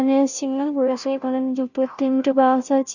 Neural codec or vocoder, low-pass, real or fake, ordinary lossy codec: codec, 16 kHz, 0.5 kbps, FunCodec, trained on Chinese and English, 25 frames a second; 7.2 kHz; fake; none